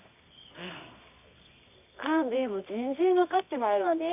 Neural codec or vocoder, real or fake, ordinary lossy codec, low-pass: codec, 24 kHz, 0.9 kbps, WavTokenizer, medium music audio release; fake; none; 3.6 kHz